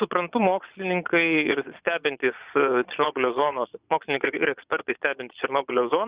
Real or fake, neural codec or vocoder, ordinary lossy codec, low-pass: fake; vocoder, 22.05 kHz, 80 mel bands, Vocos; Opus, 64 kbps; 3.6 kHz